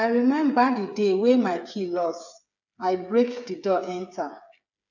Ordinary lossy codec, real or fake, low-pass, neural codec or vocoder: none; fake; 7.2 kHz; codec, 16 kHz, 8 kbps, FreqCodec, smaller model